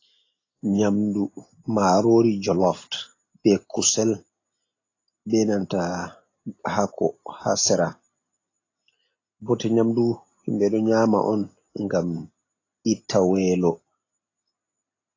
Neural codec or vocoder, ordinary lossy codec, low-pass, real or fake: none; AAC, 32 kbps; 7.2 kHz; real